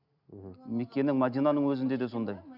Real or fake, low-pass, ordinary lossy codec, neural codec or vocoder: real; 5.4 kHz; none; none